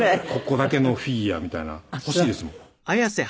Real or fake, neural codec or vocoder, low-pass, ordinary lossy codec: real; none; none; none